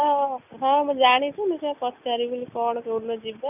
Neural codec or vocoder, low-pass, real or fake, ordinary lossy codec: none; 3.6 kHz; real; none